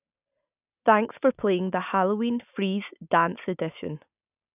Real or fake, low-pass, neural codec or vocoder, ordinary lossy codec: real; 3.6 kHz; none; none